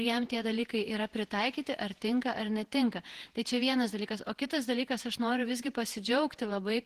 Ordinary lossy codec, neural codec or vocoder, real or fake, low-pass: Opus, 16 kbps; vocoder, 48 kHz, 128 mel bands, Vocos; fake; 14.4 kHz